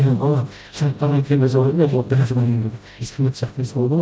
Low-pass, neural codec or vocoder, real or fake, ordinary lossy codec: none; codec, 16 kHz, 0.5 kbps, FreqCodec, smaller model; fake; none